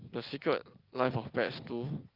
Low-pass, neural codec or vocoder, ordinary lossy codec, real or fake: 5.4 kHz; none; Opus, 32 kbps; real